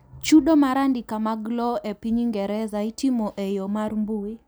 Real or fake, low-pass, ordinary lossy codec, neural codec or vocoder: real; none; none; none